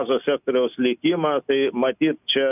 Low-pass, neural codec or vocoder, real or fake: 3.6 kHz; none; real